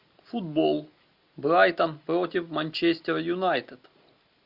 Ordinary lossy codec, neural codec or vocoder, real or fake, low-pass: AAC, 48 kbps; none; real; 5.4 kHz